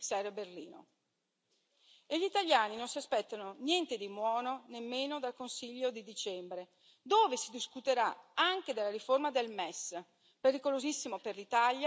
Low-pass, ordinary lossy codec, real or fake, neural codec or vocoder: none; none; real; none